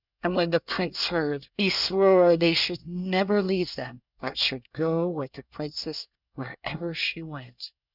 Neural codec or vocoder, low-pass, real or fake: codec, 24 kHz, 1 kbps, SNAC; 5.4 kHz; fake